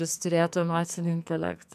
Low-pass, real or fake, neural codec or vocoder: 14.4 kHz; fake; codec, 44.1 kHz, 2.6 kbps, SNAC